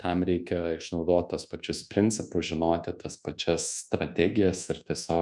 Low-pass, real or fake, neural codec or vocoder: 10.8 kHz; fake; codec, 24 kHz, 1.2 kbps, DualCodec